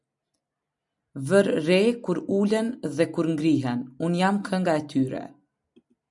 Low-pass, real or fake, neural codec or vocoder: 10.8 kHz; real; none